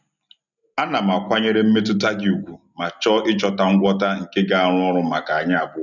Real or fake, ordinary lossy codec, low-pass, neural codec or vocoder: real; none; 7.2 kHz; none